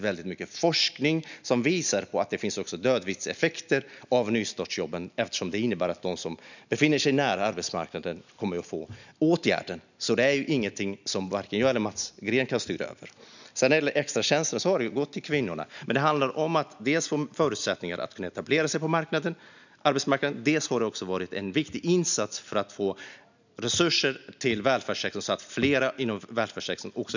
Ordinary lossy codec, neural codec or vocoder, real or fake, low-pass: none; none; real; 7.2 kHz